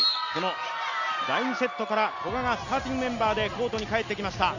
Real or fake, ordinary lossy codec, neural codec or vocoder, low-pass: real; none; none; 7.2 kHz